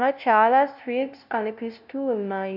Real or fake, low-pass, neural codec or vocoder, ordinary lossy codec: fake; 5.4 kHz; codec, 16 kHz, 0.5 kbps, FunCodec, trained on LibriTTS, 25 frames a second; none